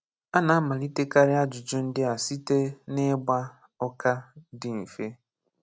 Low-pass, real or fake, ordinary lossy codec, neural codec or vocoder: none; real; none; none